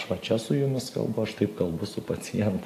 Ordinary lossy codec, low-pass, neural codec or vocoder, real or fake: AAC, 64 kbps; 14.4 kHz; none; real